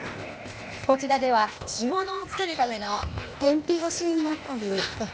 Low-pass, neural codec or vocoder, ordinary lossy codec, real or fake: none; codec, 16 kHz, 0.8 kbps, ZipCodec; none; fake